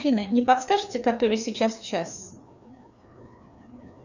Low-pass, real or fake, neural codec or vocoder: 7.2 kHz; fake; codec, 16 kHz, 2 kbps, FreqCodec, larger model